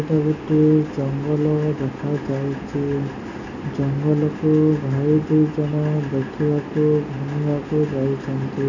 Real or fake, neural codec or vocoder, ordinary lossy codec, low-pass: real; none; none; 7.2 kHz